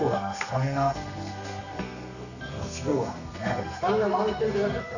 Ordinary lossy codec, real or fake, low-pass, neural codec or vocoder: none; fake; 7.2 kHz; codec, 32 kHz, 1.9 kbps, SNAC